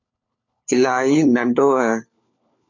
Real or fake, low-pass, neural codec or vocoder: fake; 7.2 kHz; codec, 16 kHz, 4 kbps, FunCodec, trained on LibriTTS, 50 frames a second